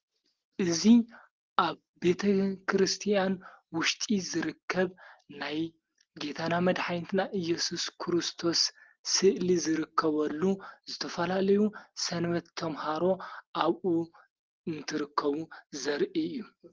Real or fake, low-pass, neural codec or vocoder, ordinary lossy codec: real; 7.2 kHz; none; Opus, 16 kbps